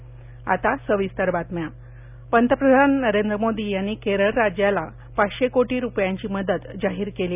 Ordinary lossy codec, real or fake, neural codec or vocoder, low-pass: none; real; none; 3.6 kHz